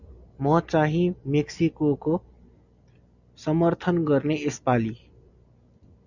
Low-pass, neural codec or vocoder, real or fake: 7.2 kHz; none; real